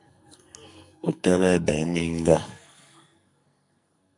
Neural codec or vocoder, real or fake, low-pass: codec, 44.1 kHz, 2.6 kbps, SNAC; fake; 10.8 kHz